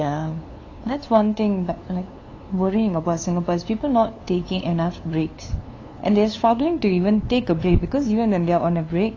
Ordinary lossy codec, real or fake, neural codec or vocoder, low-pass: AAC, 32 kbps; fake; codec, 16 kHz, 2 kbps, FunCodec, trained on LibriTTS, 25 frames a second; 7.2 kHz